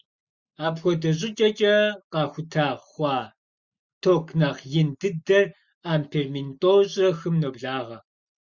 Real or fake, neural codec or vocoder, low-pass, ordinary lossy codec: real; none; 7.2 kHz; Opus, 64 kbps